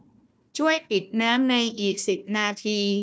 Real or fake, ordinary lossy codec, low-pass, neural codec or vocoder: fake; none; none; codec, 16 kHz, 1 kbps, FunCodec, trained on Chinese and English, 50 frames a second